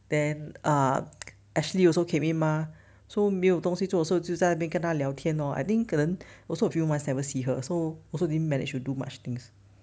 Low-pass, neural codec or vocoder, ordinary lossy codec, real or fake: none; none; none; real